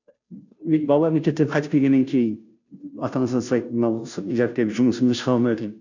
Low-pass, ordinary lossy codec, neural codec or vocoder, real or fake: 7.2 kHz; none; codec, 16 kHz, 0.5 kbps, FunCodec, trained on Chinese and English, 25 frames a second; fake